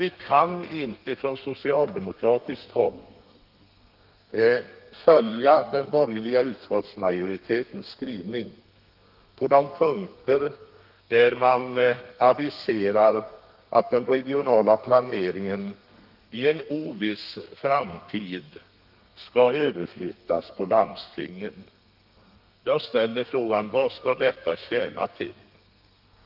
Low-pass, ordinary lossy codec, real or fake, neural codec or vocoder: 5.4 kHz; Opus, 32 kbps; fake; codec, 32 kHz, 1.9 kbps, SNAC